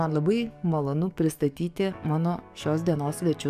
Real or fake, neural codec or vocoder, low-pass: fake; codec, 44.1 kHz, 7.8 kbps, DAC; 14.4 kHz